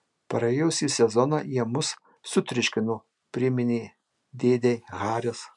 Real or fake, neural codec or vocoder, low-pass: real; none; 10.8 kHz